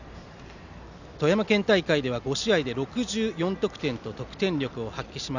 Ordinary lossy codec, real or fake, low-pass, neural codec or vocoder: none; real; 7.2 kHz; none